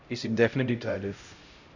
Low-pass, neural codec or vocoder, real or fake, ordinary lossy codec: 7.2 kHz; codec, 16 kHz, 0.5 kbps, X-Codec, HuBERT features, trained on LibriSpeech; fake; none